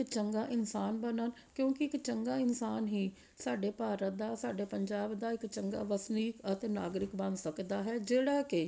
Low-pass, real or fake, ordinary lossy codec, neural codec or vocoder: none; real; none; none